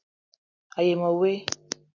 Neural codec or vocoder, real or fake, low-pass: none; real; 7.2 kHz